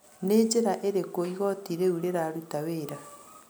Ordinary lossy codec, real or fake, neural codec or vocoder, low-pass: none; real; none; none